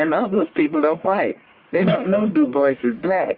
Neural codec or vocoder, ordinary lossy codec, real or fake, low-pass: codec, 44.1 kHz, 1.7 kbps, Pupu-Codec; Opus, 64 kbps; fake; 5.4 kHz